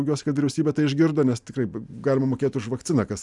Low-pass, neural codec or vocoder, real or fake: 10.8 kHz; none; real